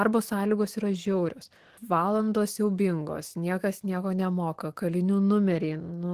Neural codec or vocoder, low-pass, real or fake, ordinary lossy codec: autoencoder, 48 kHz, 128 numbers a frame, DAC-VAE, trained on Japanese speech; 14.4 kHz; fake; Opus, 16 kbps